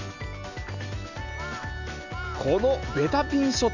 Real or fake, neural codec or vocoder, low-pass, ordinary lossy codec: real; none; 7.2 kHz; none